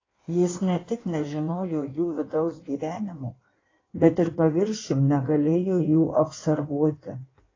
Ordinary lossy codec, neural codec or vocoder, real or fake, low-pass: AAC, 32 kbps; codec, 16 kHz in and 24 kHz out, 1.1 kbps, FireRedTTS-2 codec; fake; 7.2 kHz